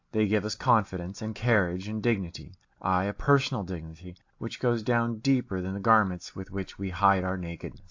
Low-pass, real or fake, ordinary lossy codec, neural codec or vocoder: 7.2 kHz; real; AAC, 48 kbps; none